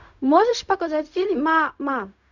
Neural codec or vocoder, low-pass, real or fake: codec, 16 kHz, 0.4 kbps, LongCat-Audio-Codec; 7.2 kHz; fake